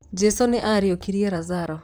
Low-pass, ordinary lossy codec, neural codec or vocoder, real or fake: none; none; vocoder, 44.1 kHz, 128 mel bands, Pupu-Vocoder; fake